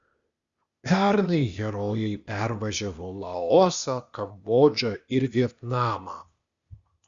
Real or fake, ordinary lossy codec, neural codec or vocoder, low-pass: fake; Opus, 64 kbps; codec, 16 kHz, 0.8 kbps, ZipCodec; 7.2 kHz